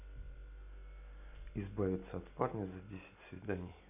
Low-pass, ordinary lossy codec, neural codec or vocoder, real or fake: 3.6 kHz; none; none; real